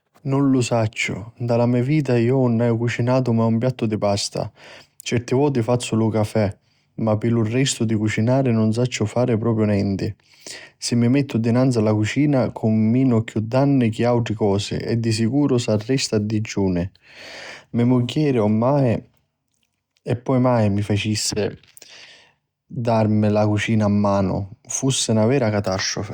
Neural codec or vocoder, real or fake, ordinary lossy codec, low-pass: none; real; Opus, 64 kbps; 19.8 kHz